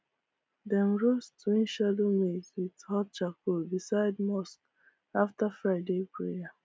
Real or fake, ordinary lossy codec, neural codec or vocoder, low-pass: real; none; none; none